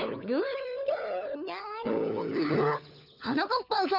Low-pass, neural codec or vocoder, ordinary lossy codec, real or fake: 5.4 kHz; codec, 16 kHz, 4 kbps, FunCodec, trained on LibriTTS, 50 frames a second; none; fake